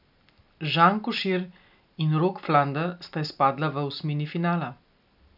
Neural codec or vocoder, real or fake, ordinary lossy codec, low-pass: none; real; none; 5.4 kHz